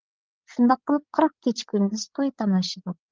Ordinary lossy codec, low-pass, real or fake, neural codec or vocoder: Opus, 32 kbps; 7.2 kHz; fake; codec, 16 kHz, 16 kbps, FreqCodec, larger model